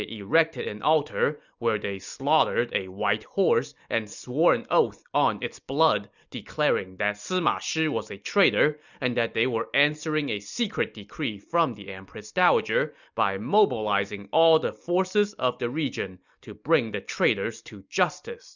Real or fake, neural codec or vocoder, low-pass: real; none; 7.2 kHz